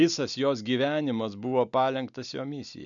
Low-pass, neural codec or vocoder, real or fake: 7.2 kHz; none; real